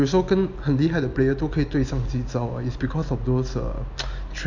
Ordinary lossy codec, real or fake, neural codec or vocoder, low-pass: none; real; none; 7.2 kHz